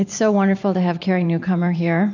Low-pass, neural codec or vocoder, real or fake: 7.2 kHz; vocoder, 44.1 kHz, 128 mel bands every 512 samples, BigVGAN v2; fake